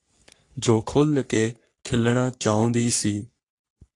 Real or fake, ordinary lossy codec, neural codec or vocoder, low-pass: fake; AAC, 48 kbps; codec, 44.1 kHz, 3.4 kbps, Pupu-Codec; 10.8 kHz